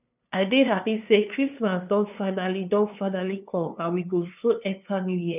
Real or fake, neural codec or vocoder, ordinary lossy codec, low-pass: fake; codec, 16 kHz, 2 kbps, FunCodec, trained on LibriTTS, 25 frames a second; none; 3.6 kHz